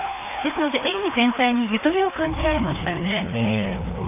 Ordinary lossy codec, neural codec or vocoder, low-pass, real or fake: none; codec, 16 kHz, 2 kbps, FreqCodec, larger model; 3.6 kHz; fake